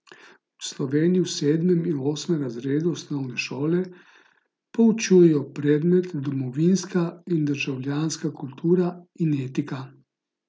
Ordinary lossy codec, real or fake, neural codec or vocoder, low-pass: none; real; none; none